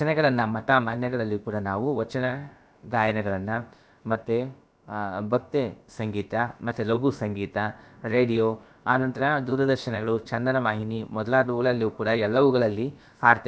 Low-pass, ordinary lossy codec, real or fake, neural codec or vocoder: none; none; fake; codec, 16 kHz, about 1 kbps, DyCAST, with the encoder's durations